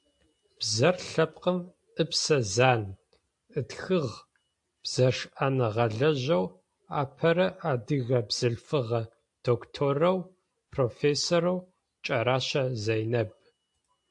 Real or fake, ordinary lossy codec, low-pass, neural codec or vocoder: real; AAC, 64 kbps; 10.8 kHz; none